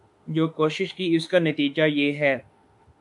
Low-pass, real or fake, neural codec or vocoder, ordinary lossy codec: 10.8 kHz; fake; autoencoder, 48 kHz, 32 numbers a frame, DAC-VAE, trained on Japanese speech; MP3, 64 kbps